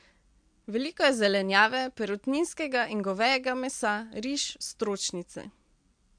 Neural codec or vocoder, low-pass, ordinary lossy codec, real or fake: none; 9.9 kHz; MP3, 64 kbps; real